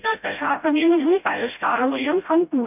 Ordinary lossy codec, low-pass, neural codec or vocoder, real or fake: none; 3.6 kHz; codec, 16 kHz, 0.5 kbps, FreqCodec, smaller model; fake